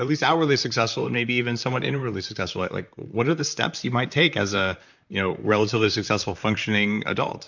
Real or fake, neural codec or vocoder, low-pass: fake; vocoder, 44.1 kHz, 128 mel bands, Pupu-Vocoder; 7.2 kHz